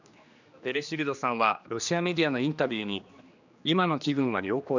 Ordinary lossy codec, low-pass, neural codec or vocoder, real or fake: none; 7.2 kHz; codec, 16 kHz, 2 kbps, X-Codec, HuBERT features, trained on general audio; fake